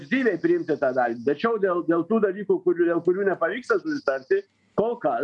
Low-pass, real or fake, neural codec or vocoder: 10.8 kHz; real; none